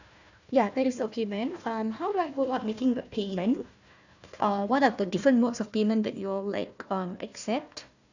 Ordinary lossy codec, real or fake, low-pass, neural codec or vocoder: none; fake; 7.2 kHz; codec, 16 kHz, 1 kbps, FunCodec, trained on Chinese and English, 50 frames a second